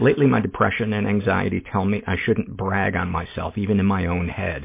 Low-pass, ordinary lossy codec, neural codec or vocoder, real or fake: 3.6 kHz; MP3, 24 kbps; none; real